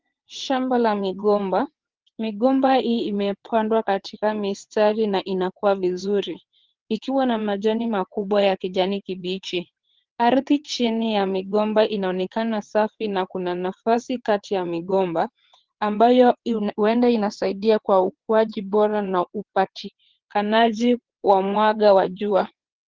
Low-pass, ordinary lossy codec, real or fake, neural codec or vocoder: 7.2 kHz; Opus, 16 kbps; fake; vocoder, 22.05 kHz, 80 mel bands, WaveNeXt